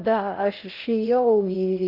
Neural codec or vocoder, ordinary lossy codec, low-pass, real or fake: codec, 16 kHz in and 24 kHz out, 0.6 kbps, FocalCodec, streaming, 4096 codes; Opus, 24 kbps; 5.4 kHz; fake